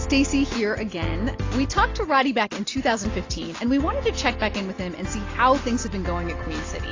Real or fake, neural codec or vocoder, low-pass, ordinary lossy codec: real; none; 7.2 kHz; AAC, 32 kbps